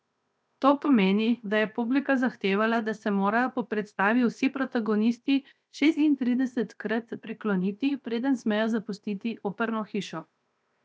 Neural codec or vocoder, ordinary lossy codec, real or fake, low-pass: codec, 16 kHz, 0.7 kbps, FocalCodec; none; fake; none